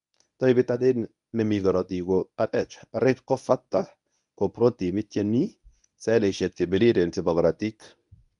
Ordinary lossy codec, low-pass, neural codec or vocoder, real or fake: none; 10.8 kHz; codec, 24 kHz, 0.9 kbps, WavTokenizer, medium speech release version 1; fake